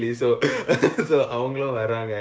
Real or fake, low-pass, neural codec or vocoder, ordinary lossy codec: fake; none; codec, 16 kHz, 6 kbps, DAC; none